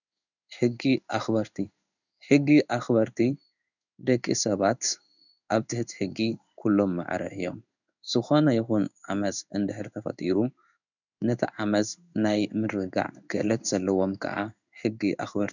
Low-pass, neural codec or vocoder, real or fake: 7.2 kHz; codec, 16 kHz in and 24 kHz out, 1 kbps, XY-Tokenizer; fake